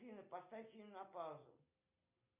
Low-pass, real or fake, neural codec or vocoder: 3.6 kHz; real; none